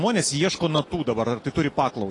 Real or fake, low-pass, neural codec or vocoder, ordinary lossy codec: real; 10.8 kHz; none; AAC, 32 kbps